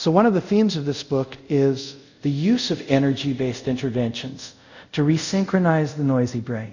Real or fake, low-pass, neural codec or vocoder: fake; 7.2 kHz; codec, 24 kHz, 0.5 kbps, DualCodec